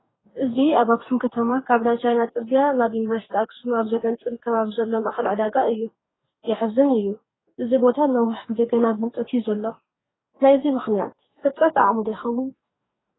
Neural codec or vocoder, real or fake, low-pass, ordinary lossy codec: codec, 44.1 kHz, 2.6 kbps, DAC; fake; 7.2 kHz; AAC, 16 kbps